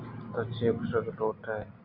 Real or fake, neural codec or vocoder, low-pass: real; none; 5.4 kHz